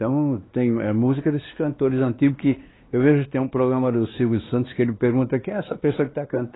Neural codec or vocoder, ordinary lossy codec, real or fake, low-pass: codec, 16 kHz, 2 kbps, X-Codec, WavLM features, trained on Multilingual LibriSpeech; AAC, 16 kbps; fake; 7.2 kHz